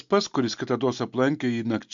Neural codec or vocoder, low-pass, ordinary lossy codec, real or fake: none; 7.2 kHz; MP3, 64 kbps; real